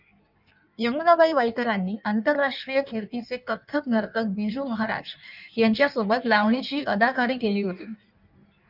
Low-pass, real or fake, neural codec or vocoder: 5.4 kHz; fake; codec, 16 kHz in and 24 kHz out, 1.1 kbps, FireRedTTS-2 codec